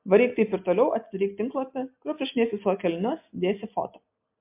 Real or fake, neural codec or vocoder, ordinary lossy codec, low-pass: real; none; MP3, 32 kbps; 3.6 kHz